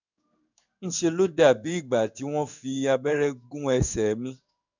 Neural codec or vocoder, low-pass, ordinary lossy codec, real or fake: codec, 16 kHz in and 24 kHz out, 1 kbps, XY-Tokenizer; 7.2 kHz; none; fake